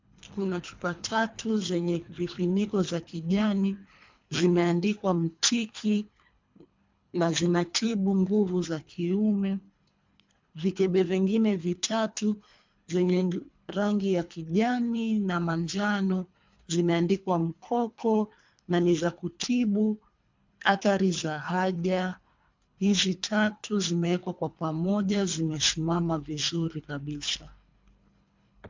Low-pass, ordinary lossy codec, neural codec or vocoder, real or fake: 7.2 kHz; MP3, 64 kbps; codec, 24 kHz, 3 kbps, HILCodec; fake